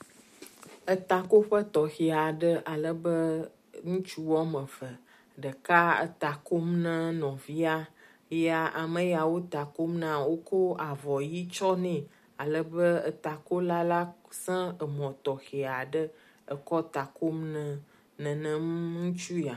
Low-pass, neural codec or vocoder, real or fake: 14.4 kHz; none; real